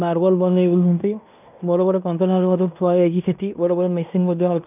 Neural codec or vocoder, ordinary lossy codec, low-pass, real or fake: codec, 16 kHz in and 24 kHz out, 0.9 kbps, LongCat-Audio-Codec, fine tuned four codebook decoder; none; 3.6 kHz; fake